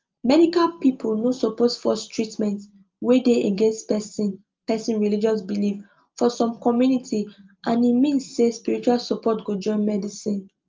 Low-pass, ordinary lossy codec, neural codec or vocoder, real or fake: 7.2 kHz; Opus, 32 kbps; none; real